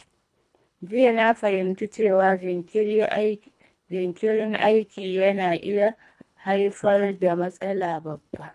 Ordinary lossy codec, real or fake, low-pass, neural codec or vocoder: none; fake; none; codec, 24 kHz, 1.5 kbps, HILCodec